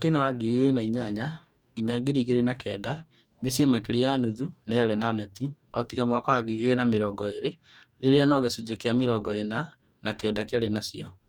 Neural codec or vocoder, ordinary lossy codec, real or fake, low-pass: codec, 44.1 kHz, 2.6 kbps, DAC; none; fake; 19.8 kHz